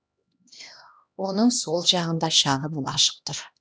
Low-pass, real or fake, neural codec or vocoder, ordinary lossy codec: none; fake; codec, 16 kHz, 1 kbps, X-Codec, HuBERT features, trained on LibriSpeech; none